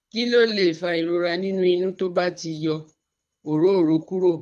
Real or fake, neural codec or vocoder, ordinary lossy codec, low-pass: fake; codec, 24 kHz, 3 kbps, HILCodec; none; none